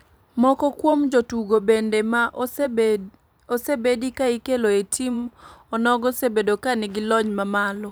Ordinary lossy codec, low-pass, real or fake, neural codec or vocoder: none; none; fake; vocoder, 44.1 kHz, 128 mel bands every 512 samples, BigVGAN v2